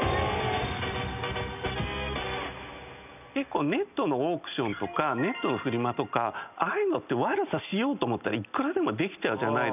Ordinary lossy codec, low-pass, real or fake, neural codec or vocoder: none; 3.6 kHz; real; none